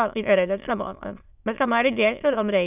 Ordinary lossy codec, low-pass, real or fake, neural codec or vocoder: none; 3.6 kHz; fake; autoencoder, 22.05 kHz, a latent of 192 numbers a frame, VITS, trained on many speakers